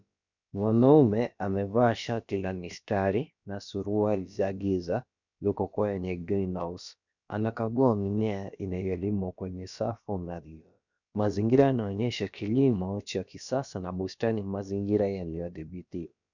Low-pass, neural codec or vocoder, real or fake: 7.2 kHz; codec, 16 kHz, about 1 kbps, DyCAST, with the encoder's durations; fake